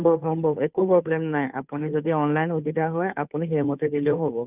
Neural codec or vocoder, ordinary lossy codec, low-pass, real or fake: codec, 16 kHz, 2 kbps, FunCodec, trained on Chinese and English, 25 frames a second; none; 3.6 kHz; fake